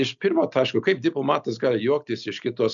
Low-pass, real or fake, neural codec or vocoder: 7.2 kHz; real; none